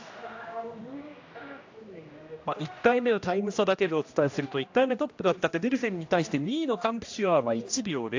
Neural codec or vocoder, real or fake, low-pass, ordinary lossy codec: codec, 16 kHz, 1 kbps, X-Codec, HuBERT features, trained on general audio; fake; 7.2 kHz; AAC, 48 kbps